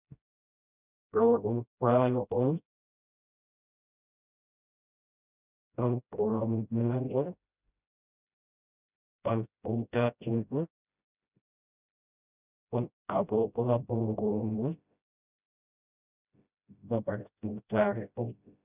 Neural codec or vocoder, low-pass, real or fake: codec, 16 kHz, 0.5 kbps, FreqCodec, smaller model; 3.6 kHz; fake